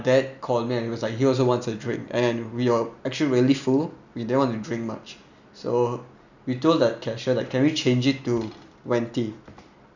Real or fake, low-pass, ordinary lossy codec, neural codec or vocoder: real; 7.2 kHz; none; none